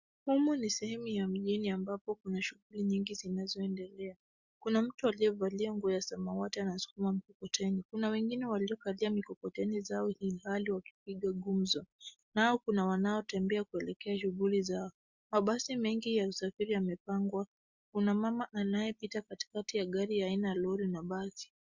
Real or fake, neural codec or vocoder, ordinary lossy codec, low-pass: real; none; Opus, 64 kbps; 7.2 kHz